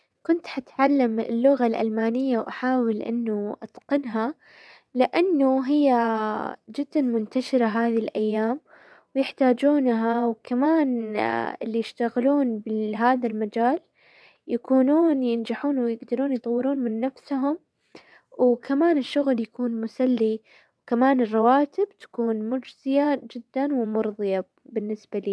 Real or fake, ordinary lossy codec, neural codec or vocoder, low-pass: fake; none; vocoder, 22.05 kHz, 80 mel bands, WaveNeXt; none